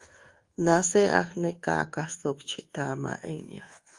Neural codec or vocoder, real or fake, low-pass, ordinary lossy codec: codec, 24 kHz, 1.2 kbps, DualCodec; fake; 10.8 kHz; Opus, 24 kbps